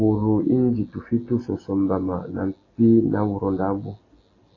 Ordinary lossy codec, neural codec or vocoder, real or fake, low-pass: AAC, 32 kbps; none; real; 7.2 kHz